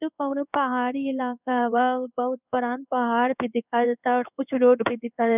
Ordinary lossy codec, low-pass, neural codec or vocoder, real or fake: none; 3.6 kHz; codec, 16 kHz in and 24 kHz out, 1 kbps, XY-Tokenizer; fake